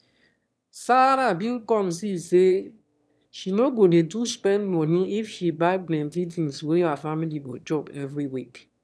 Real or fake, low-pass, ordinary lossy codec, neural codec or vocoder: fake; none; none; autoencoder, 22.05 kHz, a latent of 192 numbers a frame, VITS, trained on one speaker